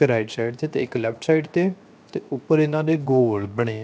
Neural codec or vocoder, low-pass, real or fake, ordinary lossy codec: codec, 16 kHz, 0.7 kbps, FocalCodec; none; fake; none